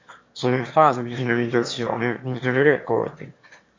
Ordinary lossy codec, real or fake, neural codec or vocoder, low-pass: MP3, 48 kbps; fake; autoencoder, 22.05 kHz, a latent of 192 numbers a frame, VITS, trained on one speaker; 7.2 kHz